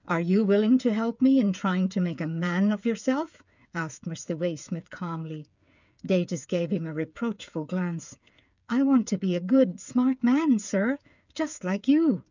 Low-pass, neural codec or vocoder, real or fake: 7.2 kHz; codec, 16 kHz, 8 kbps, FreqCodec, smaller model; fake